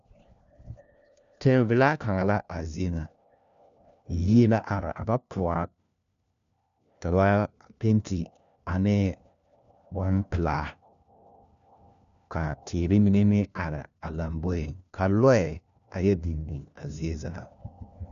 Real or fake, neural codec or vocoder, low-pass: fake; codec, 16 kHz, 1 kbps, FunCodec, trained on LibriTTS, 50 frames a second; 7.2 kHz